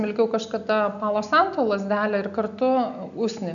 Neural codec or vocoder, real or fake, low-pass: none; real; 7.2 kHz